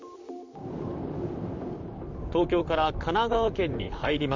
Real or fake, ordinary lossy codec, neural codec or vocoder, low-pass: fake; MP3, 64 kbps; vocoder, 44.1 kHz, 128 mel bands, Pupu-Vocoder; 7.2 kHz